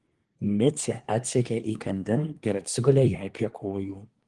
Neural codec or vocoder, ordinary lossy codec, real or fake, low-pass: codec, 24 kHz, 1 kbps, SNAC; Opus, 24 kbps; fake; 10.8 kHz